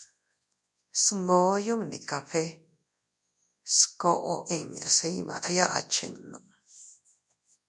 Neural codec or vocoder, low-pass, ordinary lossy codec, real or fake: codec, 24 kHz, 0.9 kbps, WavTokenizer, large speech release; 10.8 kHz; MP3, 64 kbps; fake